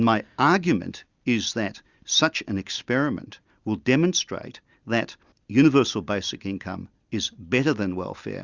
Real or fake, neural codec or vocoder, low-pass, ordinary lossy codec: real; none; 7.2 kHz; Opus, 64 kbps